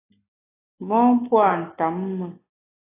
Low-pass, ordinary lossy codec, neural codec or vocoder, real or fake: 3.6 kHz; AAC, 24 kbps; none; real